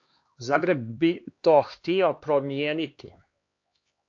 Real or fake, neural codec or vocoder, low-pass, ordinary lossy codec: fake; codec, 16 kHz, 2 kbps, X-Codec, HuBERT features, trained on LibriSpeech; 7.2 kHz; AAC, 48 kbps